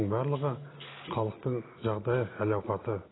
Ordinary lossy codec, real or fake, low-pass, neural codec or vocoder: AAC, 16 kbps; real; 7.2 kHz; none